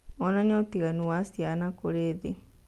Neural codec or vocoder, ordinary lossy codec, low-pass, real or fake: none; Opus, 32 kbps; 14.4 kHz; real